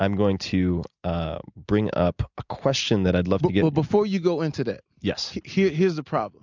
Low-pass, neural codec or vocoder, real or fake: 7.2 kHz; none; real